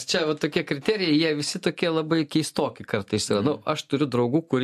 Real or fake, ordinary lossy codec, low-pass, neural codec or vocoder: real; MP3, 64 kbps; 14.4 kHz; none